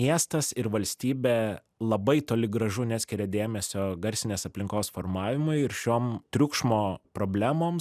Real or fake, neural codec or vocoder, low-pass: real; none; 14.4 kHz